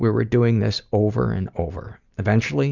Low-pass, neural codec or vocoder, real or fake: 7.2 kHz; none; real